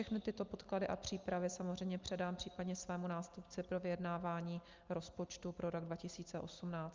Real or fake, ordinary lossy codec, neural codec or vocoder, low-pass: real; Opus, 24 kbps; none; 7.2 kHz